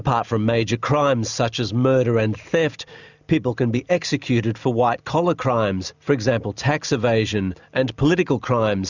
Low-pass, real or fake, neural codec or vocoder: 7.2 kHz; real; none